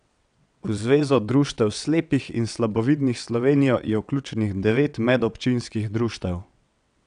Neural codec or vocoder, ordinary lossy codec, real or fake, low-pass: vocoder, 22.05 kHz, 80 mel bands, WaveNeXt; none; fake; 9.9 kHz